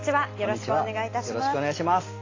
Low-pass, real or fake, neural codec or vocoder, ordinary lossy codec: 7.2 kHz; real; none; AAC, 32 kbps